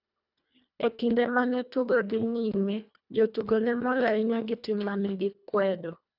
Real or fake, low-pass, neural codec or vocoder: fake; 5.4 kHz; codec, 24 kHz, 1.5 kbps, HILCodec